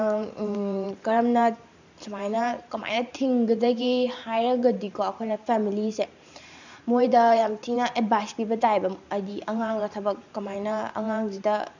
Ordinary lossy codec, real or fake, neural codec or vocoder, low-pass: none; fake; vocoder, 44.1 kHz, 128 mel bands every 512 samples, BigVGAN v2; 7.2 kHz